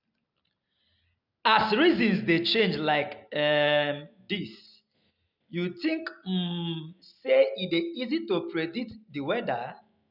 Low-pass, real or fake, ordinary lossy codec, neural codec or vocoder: 5.4 kHz; real; none; none